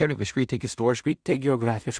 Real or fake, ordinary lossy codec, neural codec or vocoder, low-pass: fake; Opus, 64 kbps; codec, 16 kHz in and 24 kHz out, 0.4 kbps, LongCat-Audio-Codec, two codebook decoder; 9.9 kHz